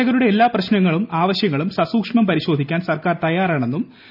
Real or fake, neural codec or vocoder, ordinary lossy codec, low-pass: real; none; none; 5.4 kHz